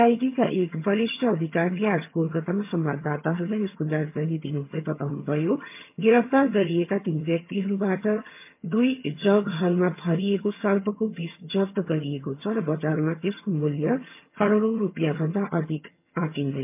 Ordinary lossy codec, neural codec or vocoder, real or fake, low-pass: MP3, 32 kbps; vocoder, 22.05 kHz, 80 mel bands, HiFi-GAN; fake; 3.6 kHz